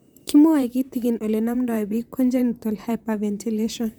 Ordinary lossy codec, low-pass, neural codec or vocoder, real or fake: none; none; vocoder, 44.1 kHz, 128 mel bands, Pupu-Vocoder; fake